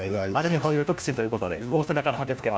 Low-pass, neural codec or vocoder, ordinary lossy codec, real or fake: none; codec, 16 kHz, 1 kbps, FunCodec, trained on LibriTTS, 50 frames a second; none; fake